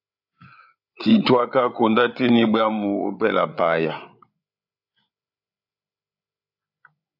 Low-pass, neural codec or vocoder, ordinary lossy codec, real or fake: 5.4 kHz; codec, 16 kHz, 8 kbps, FreqCodec, larger model; AAC, 48 kbps; fake